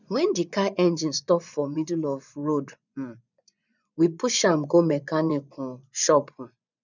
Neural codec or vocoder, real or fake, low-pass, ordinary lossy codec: vocoder, 24 kHz, 100 mel bands, Vocos; fake; 7.2 kHz; none